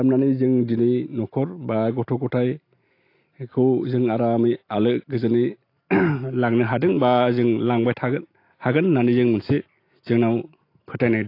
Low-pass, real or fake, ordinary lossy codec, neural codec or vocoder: 5.4 kHz; real; AAC, 32 kbps; none